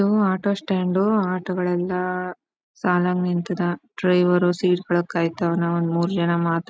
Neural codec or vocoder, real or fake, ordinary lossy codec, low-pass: none; real; none; none